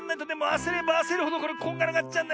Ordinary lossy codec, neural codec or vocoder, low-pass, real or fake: none; none; none; real